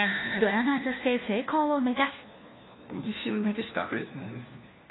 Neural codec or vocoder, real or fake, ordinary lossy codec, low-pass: codec, 16 kHz, 1 kbps, FunCodec, trained on LibriTTS, 50 frames a second; fake; AAC, 16 kbps; 7.2 kHz